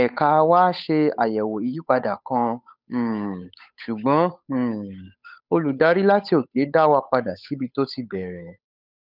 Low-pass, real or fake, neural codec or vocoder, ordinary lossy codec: 5.4 kHz; fake; codec, 16 kHz, 8 kbps, FunCodec, trained on Chinese and English, 25 frames a second; none